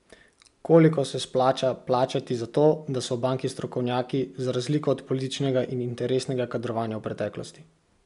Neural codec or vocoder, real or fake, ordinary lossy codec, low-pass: none; real; none; 10.8 kHz